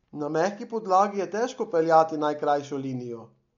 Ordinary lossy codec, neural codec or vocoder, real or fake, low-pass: MP3, 48 kbps; none; real; 7.2 kHz